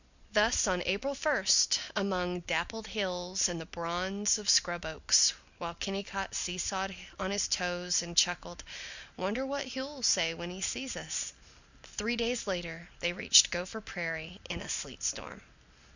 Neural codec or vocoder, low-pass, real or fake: none; 7.2 kHz; real